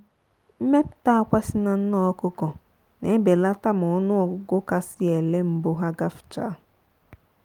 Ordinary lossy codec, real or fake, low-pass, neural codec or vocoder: Opus, 32 kbps; real; 19.8 kHz; none